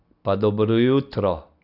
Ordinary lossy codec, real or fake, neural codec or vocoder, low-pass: none; real; none; 5.4 kHz